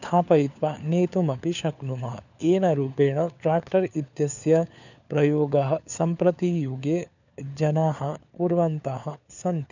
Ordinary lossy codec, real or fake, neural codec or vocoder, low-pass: none; fake; codec, 16 kHz, 4 kbps, FunCodec, trained on LibriTTS, 50 frames a second; 7.2 kHz